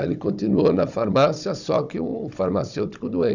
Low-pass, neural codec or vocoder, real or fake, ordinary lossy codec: 7.2 kHz; codec, 16 kHz, 16 kbps, FunCodec, trained on Chinese and English, 50 frames a second; fake; none